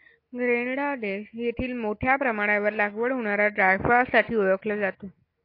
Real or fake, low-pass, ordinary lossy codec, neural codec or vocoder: real; 5.4 kHz; AAC, 32 kbps; none